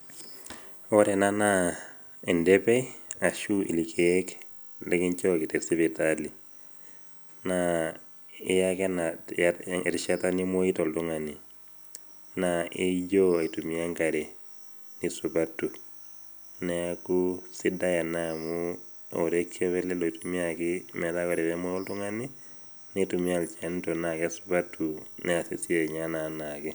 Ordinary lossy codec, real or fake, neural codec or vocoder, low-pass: none; real; none; none